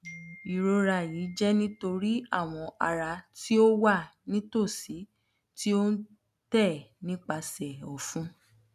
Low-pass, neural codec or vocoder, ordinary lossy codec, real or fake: 14.4 kHz; none; none; real